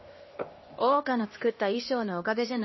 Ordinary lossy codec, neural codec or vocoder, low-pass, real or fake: MP3, 24 kbps; codec, 16 kHz, 1 kbps, X-Codec, HuBERT features, trained on LibriSpeech; 7.2 kHz; fake